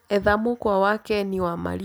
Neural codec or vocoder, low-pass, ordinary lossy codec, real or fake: none; none; none; real